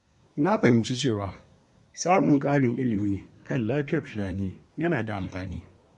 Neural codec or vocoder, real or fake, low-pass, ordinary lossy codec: codec, 24 kHz, 1 kbps, SNAC; fake; 10.8 kHz; MP3, 64 kbps